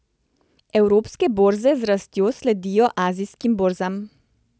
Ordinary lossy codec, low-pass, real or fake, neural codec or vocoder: none; none; real; none